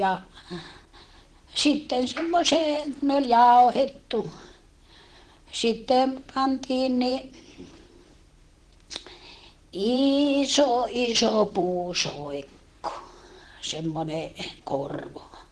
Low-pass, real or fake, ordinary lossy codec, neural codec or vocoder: 10.8 kHz; fake; Opus, 16 kbps; vocoder, 44.1 kHz, 128 mel bands, Pupu-Vocoder